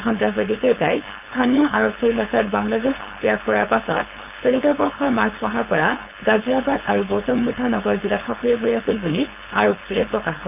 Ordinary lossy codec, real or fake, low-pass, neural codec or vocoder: none; fake; 3.6 kHz; codec, 16 kHz, 4.8 kbps, FACodec